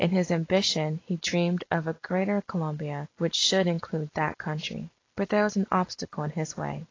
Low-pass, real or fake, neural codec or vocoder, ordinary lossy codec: 7.2 kHz; real; none; AAC, 32 kbps